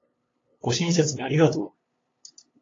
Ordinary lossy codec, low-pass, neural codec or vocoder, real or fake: AAC, 32 kbps; 7.2 kHz; codec, 16 kHz, 2 kbps, FunCodec, trained on LibriTTS, 25 frames a second; fake